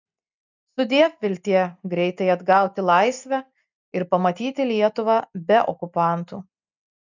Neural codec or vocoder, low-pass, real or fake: none; 7.2 kHz; real